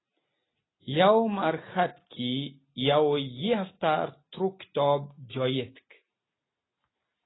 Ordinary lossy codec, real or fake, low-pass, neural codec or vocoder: AAC, 16 kbps; real; 7.2 kHz; none